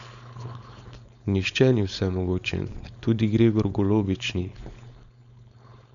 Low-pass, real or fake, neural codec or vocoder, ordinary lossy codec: 7.2 kHz; fake; codec, 16 kHz, 4.8 kbps, FACodec; MP3, 96 kbps